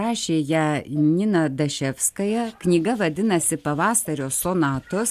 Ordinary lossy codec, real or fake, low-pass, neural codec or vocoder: AAC, 96 kbps; real; 14.4 kHz; none